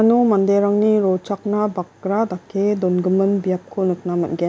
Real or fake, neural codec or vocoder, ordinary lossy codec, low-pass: real; none; none; none